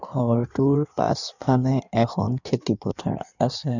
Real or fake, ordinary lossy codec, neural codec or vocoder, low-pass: fake; none; codec, 16 kHz in and 24 kHz out, 1.1 kbps, FireRedTTS-2 codec; 7.2 kHz